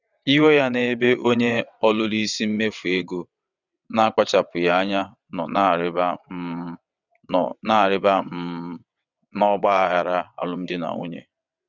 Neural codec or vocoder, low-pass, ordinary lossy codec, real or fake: vocoder, 22.05 kHz, 80 mel bands, WaveNeXt; 7.2 kHz; none; fake